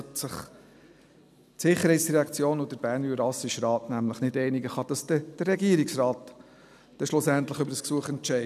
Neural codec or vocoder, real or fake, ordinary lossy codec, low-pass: none; real; none; 14.4 kHz